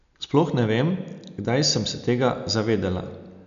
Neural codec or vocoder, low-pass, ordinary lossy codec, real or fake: none; 7.2 kHz; none; real